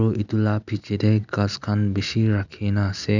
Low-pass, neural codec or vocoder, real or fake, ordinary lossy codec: 7.2 kHz; none; real; none